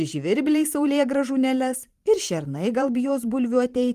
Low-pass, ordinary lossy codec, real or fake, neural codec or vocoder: 14.4 kHz; Opus, 24 kbps; fake; vocoder, 44.1 kHz, 128 mel bands every 512 samples, BigVGAN v2